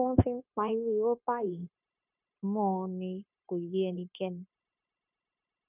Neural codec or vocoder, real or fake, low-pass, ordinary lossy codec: codec, 16 kHz, 0.9 kbps, LongCat-Audio-Codec; fake; 3.6 kHz; none